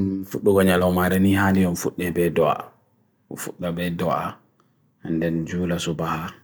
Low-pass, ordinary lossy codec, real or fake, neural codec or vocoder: none; none; real; none